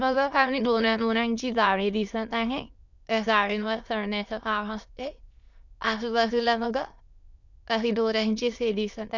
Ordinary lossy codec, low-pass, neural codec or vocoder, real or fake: none; 7.2 kHz; autoencoder, 22.05 kHz, a latent of 192 numbers a frame, VITS, trained on many speakers; fake